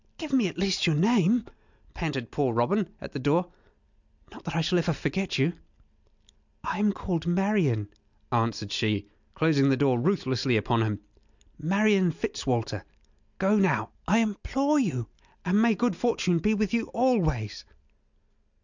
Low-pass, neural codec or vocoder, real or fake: 7.2 kHz; none; real